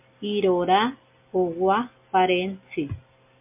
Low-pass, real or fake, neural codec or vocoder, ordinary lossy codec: 3.6 kHz; real; none; MP3, 32 kbps